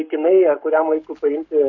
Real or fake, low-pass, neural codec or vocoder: fake; 7.2 kHz; codec, 44.1 kHz, 7.8 kbps, Pupu-Codec